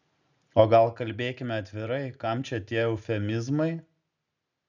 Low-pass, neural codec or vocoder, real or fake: 7.2 kHz; none; real